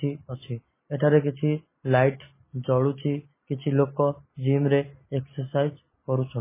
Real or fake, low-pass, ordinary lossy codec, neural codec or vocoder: real; 3.6 kHz; MP3, 16 kbps; none